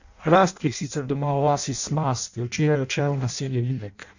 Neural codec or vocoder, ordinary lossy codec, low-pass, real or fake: codec, 16 kHz in and 24 kHz out, 0.6 kbps, FireRedTTS-2 codec; none; 7.2 kHz; fake